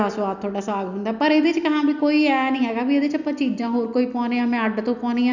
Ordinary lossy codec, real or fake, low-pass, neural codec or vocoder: none; real; 7.2 kHz; none